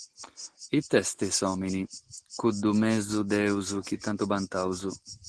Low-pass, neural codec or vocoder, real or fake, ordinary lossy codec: 9.9 kHz; none; real; Opus, 16 kbps